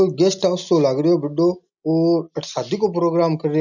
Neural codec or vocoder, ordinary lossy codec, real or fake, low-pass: none; none; real; 7.2 kHz